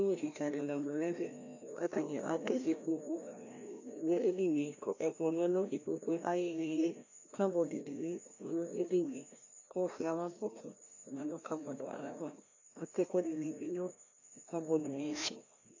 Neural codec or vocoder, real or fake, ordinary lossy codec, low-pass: codec, 16 kHz, 1 kbps, FreqCodec, larger model; fake; AAC, 48 kbps; 7.2 kHz